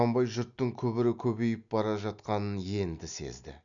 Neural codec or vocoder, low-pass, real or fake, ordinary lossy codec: none; 7.2 kHz; real; none